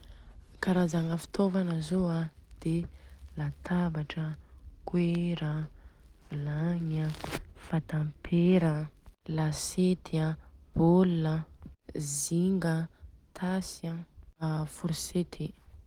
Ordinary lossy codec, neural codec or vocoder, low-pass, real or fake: Opus, 24 kbps; none; 19.8 kHz; real